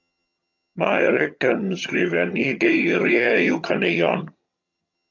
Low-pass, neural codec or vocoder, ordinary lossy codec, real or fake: 7.2 kHz; vocoder, 22.05 kHz, 80 mel bands, HiFi-GAN; AAC, 48 kbps; fake